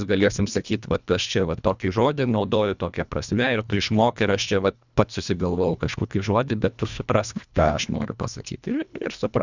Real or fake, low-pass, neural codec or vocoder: fake; 7.2 kHz; codec, 24 kHz, 1.5 kbps, HILCodec